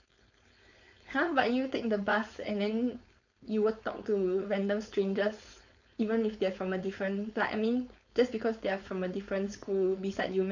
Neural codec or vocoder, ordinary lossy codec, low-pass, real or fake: codec, 16 kHz, 4.8 kbps, FACodec; none; 7.2 kHz; fake